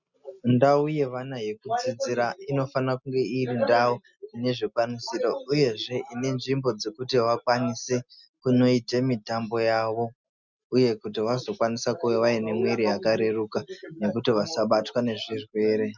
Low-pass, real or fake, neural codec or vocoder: 7.2 kHz; real; none